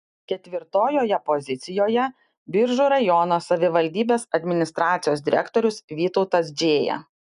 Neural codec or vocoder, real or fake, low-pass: none; real; 10.8 kHz